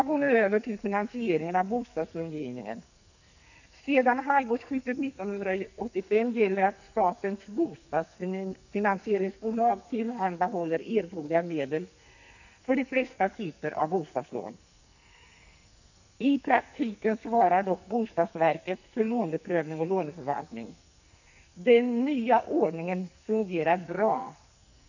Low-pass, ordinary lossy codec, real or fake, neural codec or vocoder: 7.2 kHz; none; fake; codec, 44.1 kHz, 2.6 kbps, SNAC